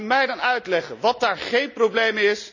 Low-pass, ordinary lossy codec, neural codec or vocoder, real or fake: 7.2 kHz; none; none; real